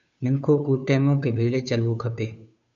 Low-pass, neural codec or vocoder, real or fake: 7.2 kHz; codec, 16 kHz, 2 kbps, FunCodec, trained on Chinese and English, 25 frames a second; fake